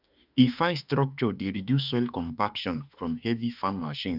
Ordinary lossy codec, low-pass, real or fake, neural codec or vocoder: none; 5.4 kHz; fake; autoencoder, 48 kHz, 32 numbers a frame, DAC-VAE, trained on Japanese speech